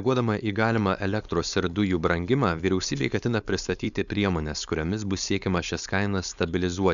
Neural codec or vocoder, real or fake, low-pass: codec, 16 kHz, 4.8 kbps, FACodec; fake; 7.2 kHz